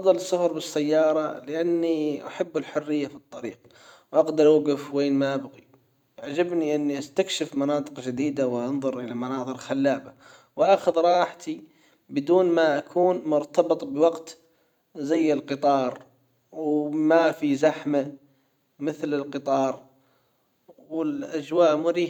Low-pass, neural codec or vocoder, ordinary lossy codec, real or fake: 19.8 kHz; vocoder, 44.1 kHz, 128 mel bands every 512 samples, BigVGAN v2; none; fake